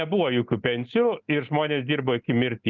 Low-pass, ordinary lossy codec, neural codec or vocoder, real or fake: 7.2 kHz; Opus, 32 kbps; none; real